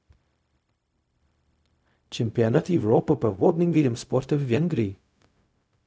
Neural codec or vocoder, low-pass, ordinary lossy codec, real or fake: codec, 16 kHz, 0.4 kbps, LongCat-Audio-Codec; none; none; fake